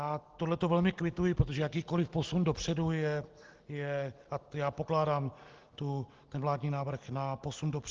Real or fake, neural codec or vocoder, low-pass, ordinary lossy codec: real; none; 7.2 kHz; Opus, 16 kbps